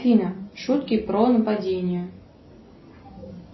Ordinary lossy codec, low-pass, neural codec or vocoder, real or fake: MP3, 24 kbps; 7.2 kHz; none; real